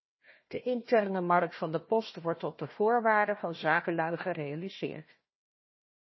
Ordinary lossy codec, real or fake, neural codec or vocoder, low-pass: MP3, 24 kbps; fake; codec, 16 kHz, 1 kbps, FunCodec, trained on Chinese and English, 50 frames a second; 7.2 kHz